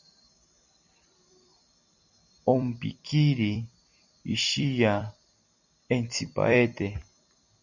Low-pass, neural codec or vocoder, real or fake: 7.2 kHz; vocoder, 44.1 kHz, 128 mel bands every 256 samples, BigVGAN v2; fake